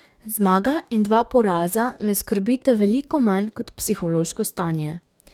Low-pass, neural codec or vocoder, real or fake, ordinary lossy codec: 19.8 kHz; codec, 44.1 kHz, 2.6 kbps, DAC; fake; none